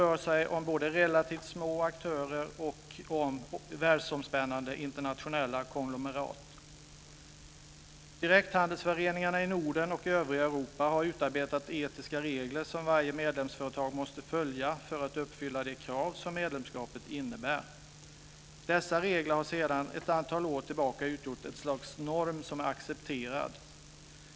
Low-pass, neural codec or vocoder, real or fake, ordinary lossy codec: none; none; real; none